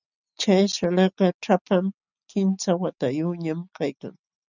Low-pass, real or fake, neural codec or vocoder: 7.2 kHz; real; none